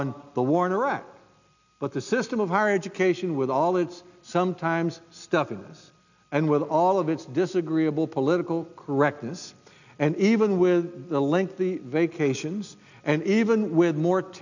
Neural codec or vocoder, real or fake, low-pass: none; real; 7.2 kHz